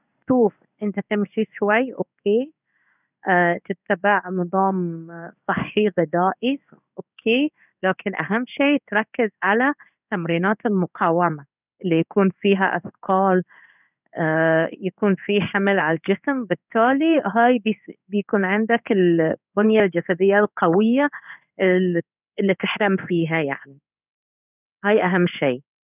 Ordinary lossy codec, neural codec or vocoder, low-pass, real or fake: none; codec, 16 kHz in and 24 kHz out, 1 kbps, XY-Tokenizer; 3.6 kHz; fake